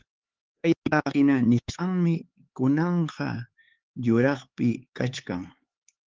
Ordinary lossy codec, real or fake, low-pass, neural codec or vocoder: Opus, 16 kbps; fake; 7.2 kHz; codec, 16 kHz, 4 kbps, X-Codec, HuBERT features, trained on LibriSpeech